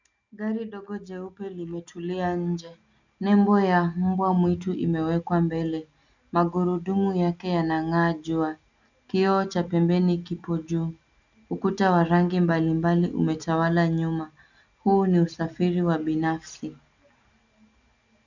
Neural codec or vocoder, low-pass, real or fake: none; 7.2 kHz; real